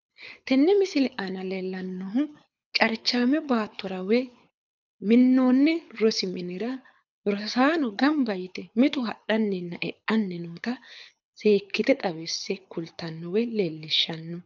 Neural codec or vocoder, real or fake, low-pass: codec, 24 kHz, 6 kbps, HILCodec; fake; 7.2 kHz